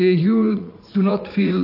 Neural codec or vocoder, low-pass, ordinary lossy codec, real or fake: vocoder, 44.1 kHz, 80 mel bands, Vocos; 5.4 kHz; AAC, 32 kbps; fake